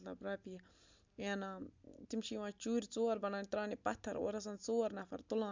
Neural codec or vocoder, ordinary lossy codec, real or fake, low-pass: none; MP3, 64 kbps; real; 7.2 kHz